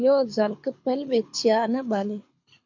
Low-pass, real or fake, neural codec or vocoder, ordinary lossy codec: 7.2 kHz; fake; codec, 24 kHz, 6 kbps, HILCodec; MP3, 64 kbps